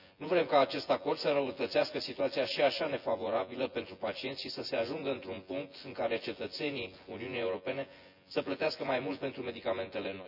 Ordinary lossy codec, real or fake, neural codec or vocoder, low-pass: none; fake; vocoder, 24 kHz, 100 mel bands, Vocos; 5.4 kHz